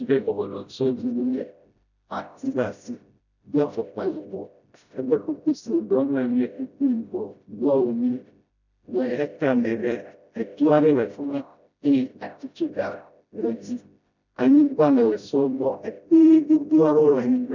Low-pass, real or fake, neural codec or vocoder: 7.2 kHz; fake; codec, 16 kHz, 0.5 kbps, FreqCodec, smaller model